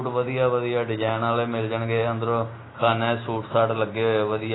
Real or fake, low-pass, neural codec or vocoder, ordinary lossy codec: real; 7.2 kHz; none; AAC, 16 kbps